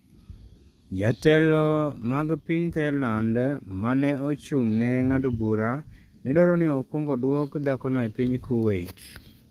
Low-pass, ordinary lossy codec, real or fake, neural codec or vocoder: 14.4 kHz; Opus, 32 kbps; fake; codec, 32 kHz, 1.9 kbps, SNAC